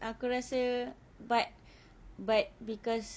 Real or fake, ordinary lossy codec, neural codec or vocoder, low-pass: real; none; none; none